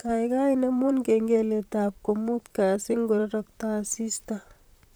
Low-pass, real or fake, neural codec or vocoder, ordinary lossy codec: none; fake; vocoder, 44.1 kHz, 128 mel bands, Pupu-Vocoder; none